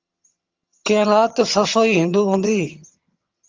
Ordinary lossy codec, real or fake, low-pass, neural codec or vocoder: Opus, 32 kbps; fake; 7.2 kHz; vocoder, 22.05 kHz, 80 mel bands, HiFi-GAN